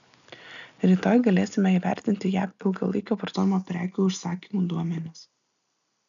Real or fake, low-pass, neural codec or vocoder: real; 7.2 kHz; none